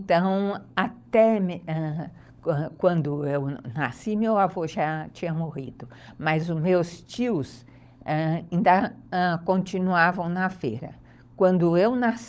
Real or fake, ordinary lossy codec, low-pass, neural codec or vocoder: fake; none; none; codec, 16 kHz, 16 kbps, FunCodec, trained on LibriTTS, 50 frames a second